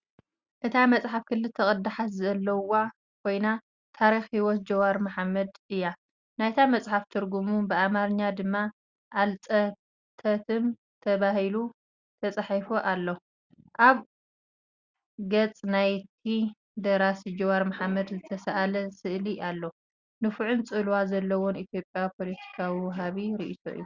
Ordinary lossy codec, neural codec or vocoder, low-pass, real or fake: Opus, 64 kbps; none; 7.2 kHz; real